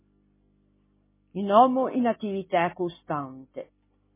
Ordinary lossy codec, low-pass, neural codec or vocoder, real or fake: MP3, 16 kbps; 3.6 kHz; none; real